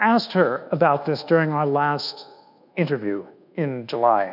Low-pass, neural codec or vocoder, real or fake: 5.4 kHz; codec, 24 kHz, 1.2 kbps, DualCodec; fake